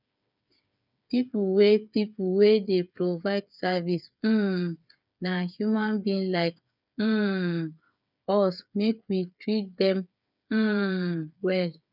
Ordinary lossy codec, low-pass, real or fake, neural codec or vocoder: none; 5.4 kHz; fake; codec, 16 kHz, 8 kbps, FreqCodec, smaller model